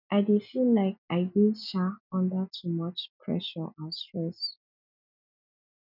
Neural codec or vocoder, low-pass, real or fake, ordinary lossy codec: none; 5.4 kHz; real; none